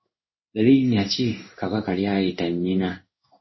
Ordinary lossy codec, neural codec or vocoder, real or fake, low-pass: MP3, 24 kbps; codec, 16 kHz in and 24 kHz out, 1 kbps, XY-Tokenizer; fake; 7.2 kHz